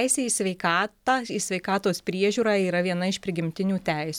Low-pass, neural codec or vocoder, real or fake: 19.8 kHz; none; real